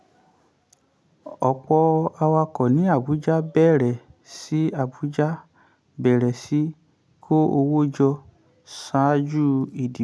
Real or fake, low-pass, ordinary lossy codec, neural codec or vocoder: real; none; none; none